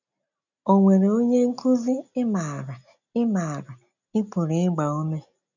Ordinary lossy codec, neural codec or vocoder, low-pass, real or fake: none; none; 7.2 kHz; real